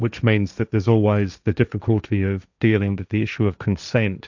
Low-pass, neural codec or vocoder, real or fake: 7.2 kHz; codec, 16 kHz, 1.1 kbps, Voila-Tokenizer; fake